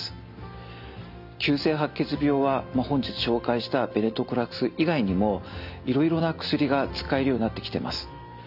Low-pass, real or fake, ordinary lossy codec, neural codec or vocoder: 5.4 kHz; real; none; none